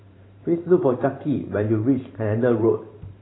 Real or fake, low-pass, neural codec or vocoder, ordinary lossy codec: fake; 7.2 kHz; vocoder, 44.1 kHz, 128 mel bands every 512 samples, BigVGAN v2; AAC, 16 kbps